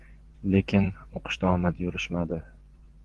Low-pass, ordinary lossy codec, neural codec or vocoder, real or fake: 10.8 kHz; Opus, 16 kbps; vocoder, 24 kHz, 100 mel bands, Vocos; fake